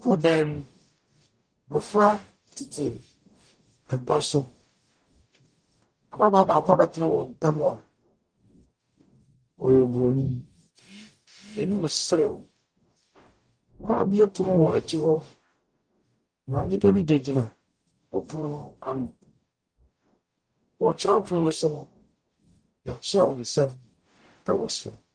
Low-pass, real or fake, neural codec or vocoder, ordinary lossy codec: 9.9 kHz; fake; codec, 44.1 kHz, 0.9 kbps, DAC; Opus, 32 kbps